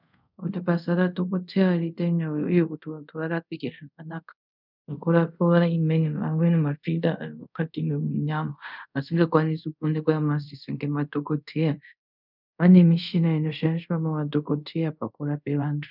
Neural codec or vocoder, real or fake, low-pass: codec, 24 kHz, 0.5 kbps, DualCodec; fake; 5.4 kHz